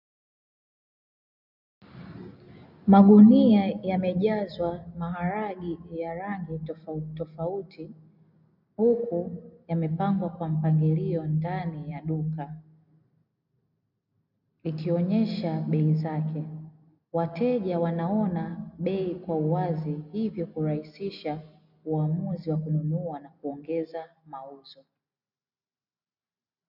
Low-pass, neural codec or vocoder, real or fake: 5.4 kHz; none; real